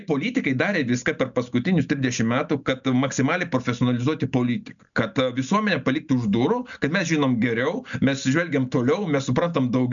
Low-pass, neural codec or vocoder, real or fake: 7.2 kHz; none; real